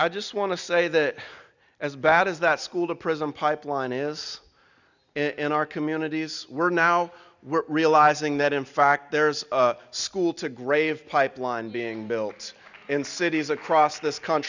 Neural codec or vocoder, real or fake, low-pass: none; real; 7.2 kHz